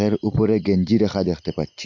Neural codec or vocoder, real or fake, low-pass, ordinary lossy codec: none; real; 7.2 kHz; MP3, 48 kbps